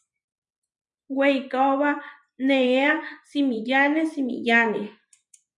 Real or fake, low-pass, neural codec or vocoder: fake; 10.8 kHz; vocoder, 24 kHz, 100 mel bands, Vocos